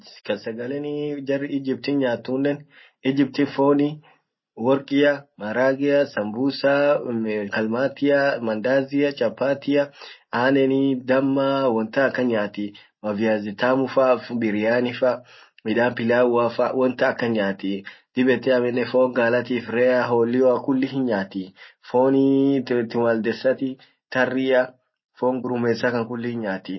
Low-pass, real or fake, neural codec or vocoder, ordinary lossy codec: 7.2 kHz; real; none; MP3, 24 kbps